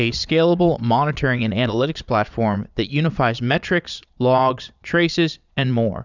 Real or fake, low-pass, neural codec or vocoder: fake; 7.2 kHz; vocoder, 22.05 kHz, 80 mel bands, Vocos